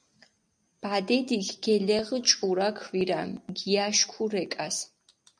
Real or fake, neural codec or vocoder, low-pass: real; none; 9.9 kHz